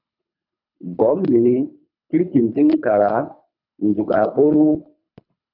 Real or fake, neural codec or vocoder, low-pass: fake; codec, 24 kHz, 3 kbps, HILCodec; 5.4 kHz